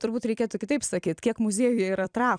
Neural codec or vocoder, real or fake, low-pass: vocoder, 44.1 kHz, 128 mel bands every 256 samples, BigVGAN v2; fake; 9.9 kHz